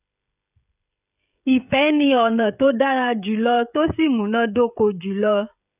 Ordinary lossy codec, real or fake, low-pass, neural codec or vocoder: none; fake; 3.6 kHz; codec, 16 kHz, 16 kbps, FreqCodec, smaller model